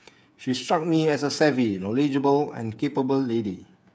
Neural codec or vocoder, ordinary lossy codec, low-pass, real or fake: codec, 16 kHz, 8 kbps, FreqCodec, smaller model; none; none; fake